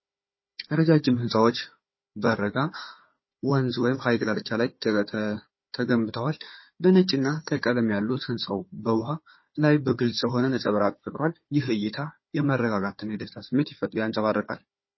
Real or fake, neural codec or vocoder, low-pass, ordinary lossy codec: fake; codec, 16 kHz, 4 kbps, FunCodec, trained on Chinese and English, 50 frames a second; 7.2 kHz; MP3, 24 kbps